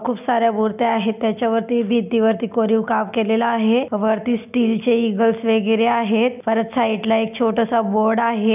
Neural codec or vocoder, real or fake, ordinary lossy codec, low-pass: none; real; none; 3.6 kHz